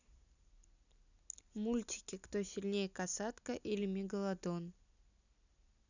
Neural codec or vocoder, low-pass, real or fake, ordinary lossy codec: none; 7.2 kHz; real; none